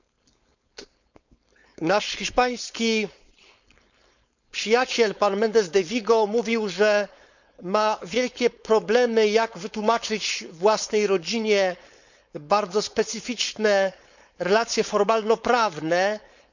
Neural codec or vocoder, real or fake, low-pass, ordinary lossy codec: codec, 16 kHz, 4.8 kbps, FACodec; fake; 7.2 kHz; none